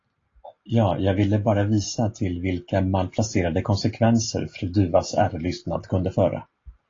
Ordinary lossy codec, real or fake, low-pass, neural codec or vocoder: AAC, 32 kbps; real; 7.2 kHz; none